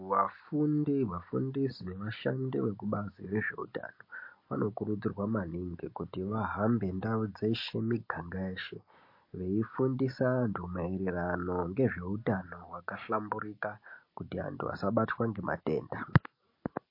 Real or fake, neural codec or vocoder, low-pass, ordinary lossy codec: real; none; 5.4 kHz; MP3, 32 kbps